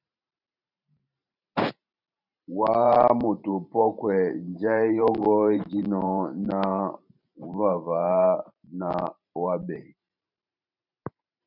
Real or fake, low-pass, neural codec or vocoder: fake; 5.4 kHz; vocoder, 44.1 kHz, 128 mel bands every 512 samples, BigVGAN v2